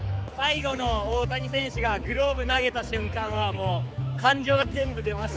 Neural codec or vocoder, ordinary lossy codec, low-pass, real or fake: codec, 16 kHz, 4 kbps, X-Codec, HuBERT features, trained on general audio; none; none; fake